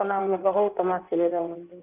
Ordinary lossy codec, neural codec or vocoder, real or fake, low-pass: none; codec, 16 kHz in and 24 kHz out, 1.1 kbps, FireRedTTS-2 codec; fake; 3.6 kHz